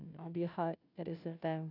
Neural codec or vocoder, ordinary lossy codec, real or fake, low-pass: codec, 16 kHz, 0.5 kbps, FunCodec, trained on Chinese and English, 25 frames a second; none; fake; 5.4 kHz